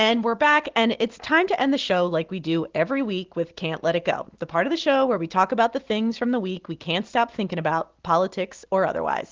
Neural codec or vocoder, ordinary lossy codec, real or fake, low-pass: none; Opus, 16 kbps; real; 7.2 kHz